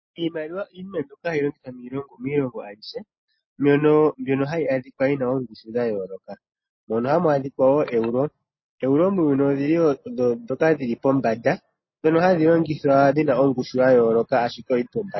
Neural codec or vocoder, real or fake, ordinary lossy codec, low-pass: none; real; MP3, 24 kbps; 7.2 kHz